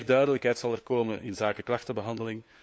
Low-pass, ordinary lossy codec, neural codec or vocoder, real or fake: none; none; codec, 16 kHz, 4 kbps, FunCodec, trained on LibriTTS, 50 frames a second; fake